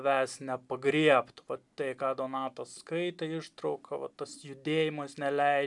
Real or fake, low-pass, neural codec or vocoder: real; 10.8 kHz; none